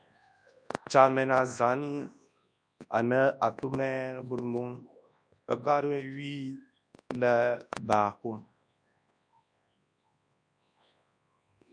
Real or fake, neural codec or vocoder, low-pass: fake; codec, 24 kHz, 0.9 kbps, WavTokenizer, large speech release; 9.9 kHz